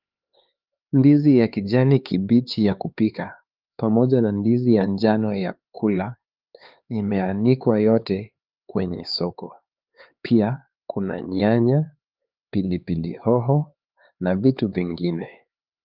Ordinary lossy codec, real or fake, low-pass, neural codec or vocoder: Opus, 24 kbps; fake; 5.4 kHz; codec, 16 kHz, 4 kbps, X-Codec, HuBERT features, trained on LibriSpeech